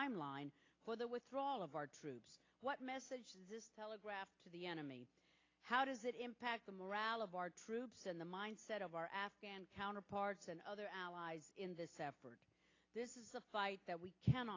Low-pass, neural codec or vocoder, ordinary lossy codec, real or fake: 7.2 kHz; none; AAC, 32 kbps; real